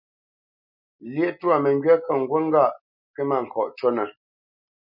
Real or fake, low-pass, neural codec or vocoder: real; 5.4 kHz; none